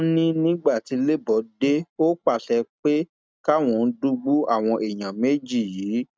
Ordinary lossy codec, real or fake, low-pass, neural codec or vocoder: none; real; none; none